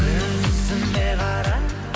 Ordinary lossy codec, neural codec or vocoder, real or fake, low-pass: none; none; real; none